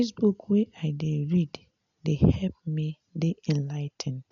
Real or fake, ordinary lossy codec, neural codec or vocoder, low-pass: real; none; none; 7.2 kHz